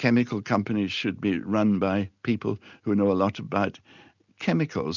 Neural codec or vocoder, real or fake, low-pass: none; real; 7.2 kHz